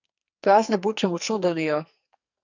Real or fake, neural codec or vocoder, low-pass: fake; codec, 44.1 kHz, 2.6 kbps, SNAC; 7.2 kHz